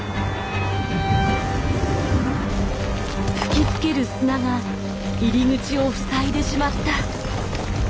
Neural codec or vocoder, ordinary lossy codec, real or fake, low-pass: none; none; real; none